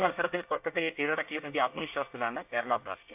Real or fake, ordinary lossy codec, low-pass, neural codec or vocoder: fake; AAC, 32 kbps; 3.6 kHz; codec, 24 kHz, 1 kbps, SNAC